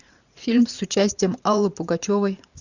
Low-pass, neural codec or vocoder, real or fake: 7.2 kHz; vocoder, 44.1 kHz, 128 mel bands every 512 samples, BigVGAN v2; fake